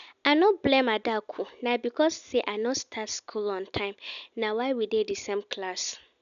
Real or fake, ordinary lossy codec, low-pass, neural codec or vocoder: real; none; 7.2 kHz; none